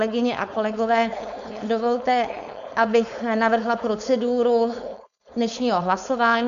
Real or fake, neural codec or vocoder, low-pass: fake; codec, 16 kHz, 4.8 kbps, FACodec; 7.2 kHz